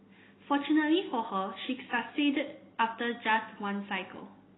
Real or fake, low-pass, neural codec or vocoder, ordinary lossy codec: real; 7.2 kHz; none; AAC, 16 kbps